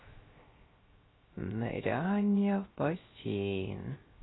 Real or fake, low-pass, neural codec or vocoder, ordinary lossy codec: fake; 7.2 kHz; codec, 16 kHz, 0.3 kbps, FocalCodec; AAC, 16 kbps